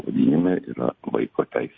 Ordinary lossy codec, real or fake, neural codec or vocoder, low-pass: MP3, 32 kbps; real; none; 7.2 kHz